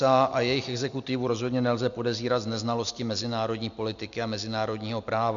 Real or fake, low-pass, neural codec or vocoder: real; 7.2 kHz; none